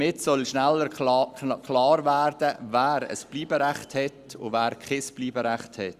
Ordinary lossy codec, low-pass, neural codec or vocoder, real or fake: Opus, 64 kbps; 14.4 kHz; none; real